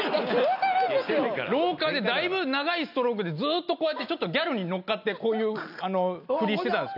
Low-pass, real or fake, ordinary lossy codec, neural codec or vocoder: 5.4 kHz; real; none; none